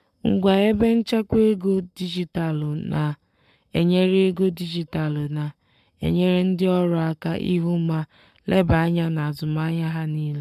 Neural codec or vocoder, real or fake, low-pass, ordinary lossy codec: codec, 44.1 kHz, 7.8 kbps, Pupu-Codec; fake; 14.4 kHz; MP3, 96 kbps